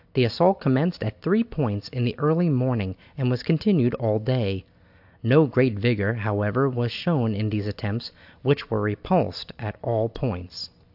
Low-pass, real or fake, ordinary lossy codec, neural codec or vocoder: 5.4 kHz; real; AAC, 48 kbps; none